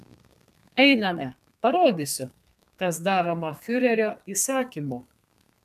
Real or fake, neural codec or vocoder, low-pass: fake; codec, 32 kHz, 1.9 kbps, SNAC; 14.4 kHz